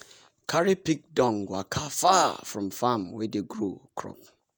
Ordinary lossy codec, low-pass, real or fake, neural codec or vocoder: none; none; fake; vocoder, 48 kHz, 128 mel bands, Vocos